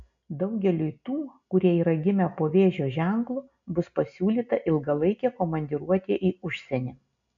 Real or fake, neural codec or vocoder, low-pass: real; none; 7.2 kHz